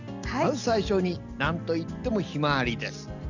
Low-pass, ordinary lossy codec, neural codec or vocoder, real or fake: 7.2 kHz; none; none; real